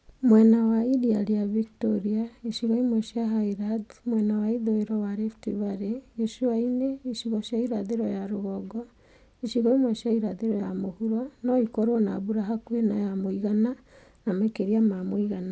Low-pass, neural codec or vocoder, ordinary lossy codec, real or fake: none; none; none; real